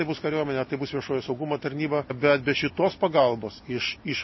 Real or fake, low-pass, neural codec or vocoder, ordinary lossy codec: real; 7.2 kHz; none; MP3, 24 kbps